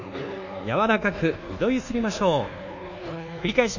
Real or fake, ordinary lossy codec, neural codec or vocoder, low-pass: fake; none; codec, 24 kHz, 1.2 kbps, DualCodec; 7.2 kHz